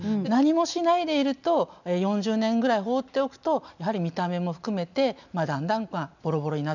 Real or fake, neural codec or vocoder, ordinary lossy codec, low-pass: real; none; none; 7.2 kHz